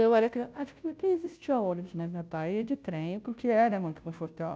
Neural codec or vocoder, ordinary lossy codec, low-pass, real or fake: codec, 16 kHz, 0.5 kbps, FunCodec, trained on Chinese and English, 25 frames a second; none; none; fake